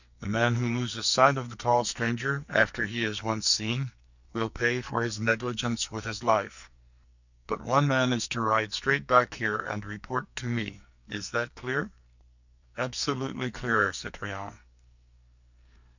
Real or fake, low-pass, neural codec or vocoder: fake; 7.2 kHz; codec, 44.1 kHz, 2.6 kbps, SNAC